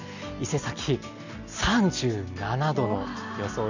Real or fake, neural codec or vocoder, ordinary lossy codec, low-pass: real; none; none; 7.2 kHz